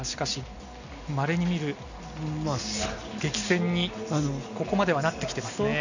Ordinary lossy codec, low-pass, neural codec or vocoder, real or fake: none; 7.2 kHz; none; real